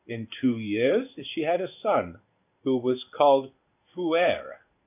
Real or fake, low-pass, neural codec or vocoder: fake; 3.6 kHz; codec, 16 kHz in and 24 kHz out, 1 kbps, XY-Tokenizer